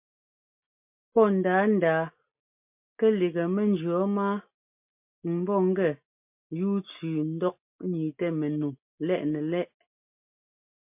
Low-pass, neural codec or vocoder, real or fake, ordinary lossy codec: 3.6 kHz; none; real; MP3, 32 kbps